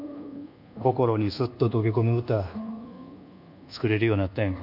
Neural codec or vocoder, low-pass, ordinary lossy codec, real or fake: codec, 24 kHz, 1.2 kbps, DualCodec; 5.4 kHz; none; fake